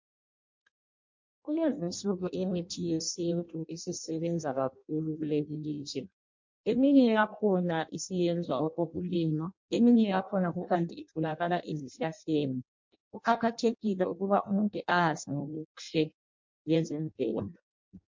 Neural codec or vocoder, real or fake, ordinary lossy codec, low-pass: codec, 16 kHz in and 24 kHz out, 0.6 kbps, FireRedTTS-2 codec; fake; MP3, 48 kbps; 7.2 kHz